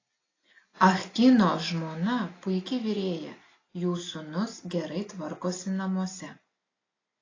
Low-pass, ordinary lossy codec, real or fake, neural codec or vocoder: 7.2 kHz; AAC, 32 kbps; real; none